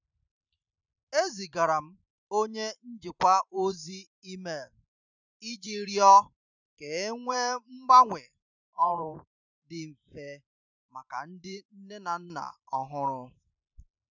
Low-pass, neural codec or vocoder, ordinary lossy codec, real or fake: 7.2 kHz; vocoder, 44.1 kHz, 128 mel bands every 512 samples, BigVGAN v2; none; fake